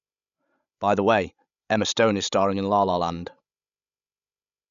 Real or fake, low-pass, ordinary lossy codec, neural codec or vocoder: fake; 7.2 kHz; none; codec, 16 kHz, 16 kbps, FreqCodec, larger model